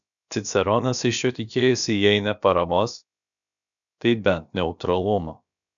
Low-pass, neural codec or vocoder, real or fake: 7.2 kHz; codec, 16 kHz, about 1 kbps, DyCAST, with the encoder's durations; fake